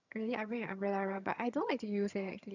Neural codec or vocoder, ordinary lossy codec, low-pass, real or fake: vocoder, 22.05 kHz, 80 mel bands, HiFi-GAN; none; 7.2 kHz; fake